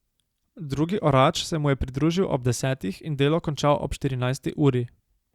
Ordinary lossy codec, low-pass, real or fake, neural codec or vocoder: Opus, 64 kbps; 19.8 kHz; real; none